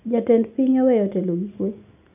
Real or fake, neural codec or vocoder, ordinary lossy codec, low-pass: real; none; none; 3.6 kHz